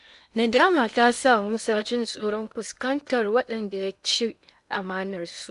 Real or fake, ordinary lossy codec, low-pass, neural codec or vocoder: fake; none; 10.8 kHz; codec, 16 kHz in and 24 kHz out, 0.8 kbps, FocalCodec, streaming, 65536 codes